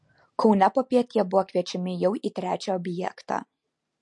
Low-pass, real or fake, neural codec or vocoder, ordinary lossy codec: 10.8 kHz; real; none; MP3, 48 kbps